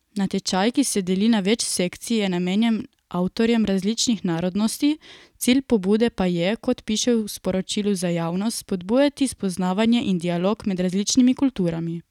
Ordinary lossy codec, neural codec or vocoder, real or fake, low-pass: none; none; real; 19.8 kHz